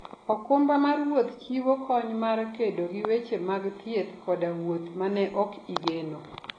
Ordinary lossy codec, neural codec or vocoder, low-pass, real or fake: AAC, 32 kbps; none; 9.9 kHz; real